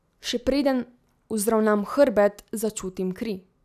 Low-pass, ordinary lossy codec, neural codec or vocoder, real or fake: 14.4 kHz; none; none; real